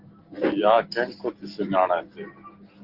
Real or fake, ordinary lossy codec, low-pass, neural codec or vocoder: real; Opus, 16 kbps; 5.4 kHz; none